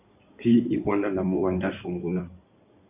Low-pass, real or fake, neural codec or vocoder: 3.6 kHz; fake; codec, 16 kHz in and 24 kHz out, 2.2 kbps, FireRedTTS-2 codec